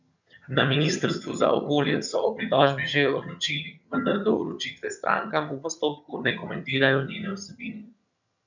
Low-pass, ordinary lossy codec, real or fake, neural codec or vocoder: 7.2 kHz; none; fake; vocoder, 22.05 kHz, 80 mel bands, HiFi-GAN